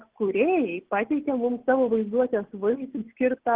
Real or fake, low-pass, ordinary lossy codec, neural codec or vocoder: real; 3.6 kHz; Opus, 16 kbps; none